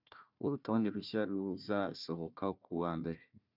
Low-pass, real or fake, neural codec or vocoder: 5.4 kHz; fake; codec, 16 kHz, 1 kbps, FunCodec, trained on Chinese and English, 50 frames a second